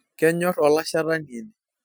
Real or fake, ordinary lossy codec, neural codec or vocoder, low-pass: real; none; none; none